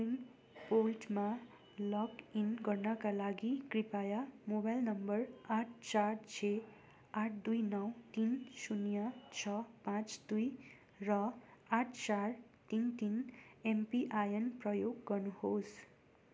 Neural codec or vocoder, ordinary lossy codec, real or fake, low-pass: none; none; real; none